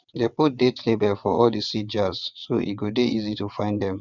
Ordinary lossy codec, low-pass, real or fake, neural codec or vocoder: Opus, 64 kbps; 7.2 kHz; fake; vocoder, 22.05 kHz, 80 mel bands, WaveNeXt